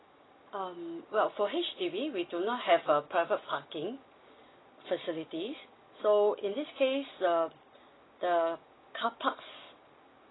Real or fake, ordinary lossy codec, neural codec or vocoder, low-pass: real; AAC, 16 kbps; none; 7.2 kHz